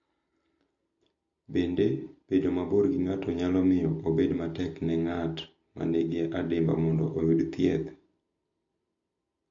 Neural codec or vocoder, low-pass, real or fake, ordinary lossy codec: none; 7.2 kHz; real; AAC, 48 kbps